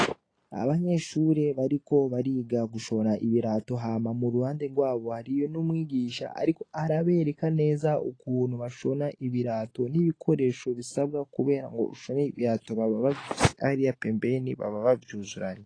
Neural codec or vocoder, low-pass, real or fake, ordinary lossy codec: none; 9.9 kHz; real; AAC, 32 kbps